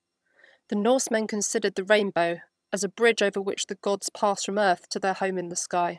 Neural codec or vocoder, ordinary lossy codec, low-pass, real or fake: vocoder, 22.05 kHz, 80 mel bands, HiFi-GAN; none; none; fake